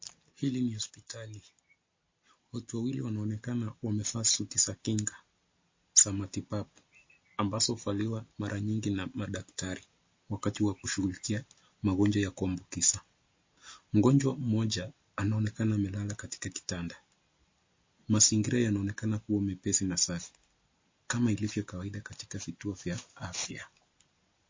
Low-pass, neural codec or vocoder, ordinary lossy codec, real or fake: 7.2 kHz; none; MP3, 32 kbps; real